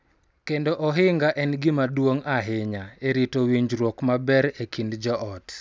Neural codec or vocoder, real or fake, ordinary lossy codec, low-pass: none; real; none; none